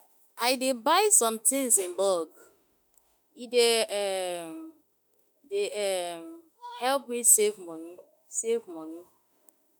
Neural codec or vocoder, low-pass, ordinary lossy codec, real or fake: autoencoder, 48 kHz, 32 numbers a frame, DAC-VAE, trained on Japanese speech; none; none; fake